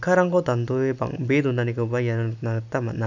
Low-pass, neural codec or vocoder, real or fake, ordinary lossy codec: 7.2 kHz; none; real; none